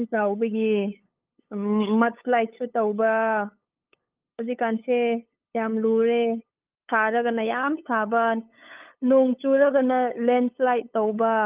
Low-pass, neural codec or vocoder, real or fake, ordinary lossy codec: 3.6 kHz; codec, 16 kHz, 8 kbps, FunCodec, trained on LibriTTS, 25 frames a second; fake; Opus, 32 kbps